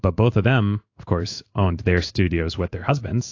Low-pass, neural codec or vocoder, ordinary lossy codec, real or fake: 7.2 kHz; codec, 16 kHz in and 24 kHz out, 1 kbps, XY-Tokenizer; AAC, 48 kbps; fake